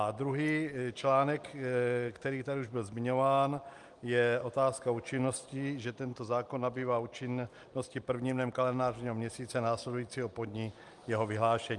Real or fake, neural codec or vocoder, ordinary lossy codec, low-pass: real; none; Opus, 32 kbps; 10.8 kHz